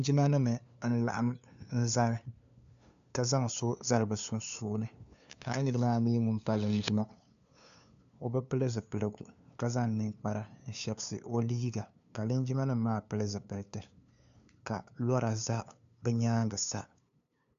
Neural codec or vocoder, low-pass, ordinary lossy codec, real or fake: codec, 16 kHz, 2 kbps, FunCodec, trained on LibriTTS, 25 frames a second; 7.2 kHz; AAC, 96 kbps; fake